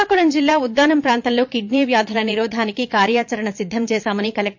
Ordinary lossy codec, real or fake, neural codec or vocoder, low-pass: MP3, 48 kbps; fake; vocoder, 22.05 kHz, 80 mel bands, Vocos; 7.2 kHz